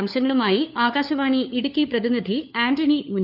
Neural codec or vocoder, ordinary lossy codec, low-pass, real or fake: codec, 16 kHz, 4 kbps, FunCodec, trained on LibriTTS, 50 frames a second; none; 5.4 kHz; fake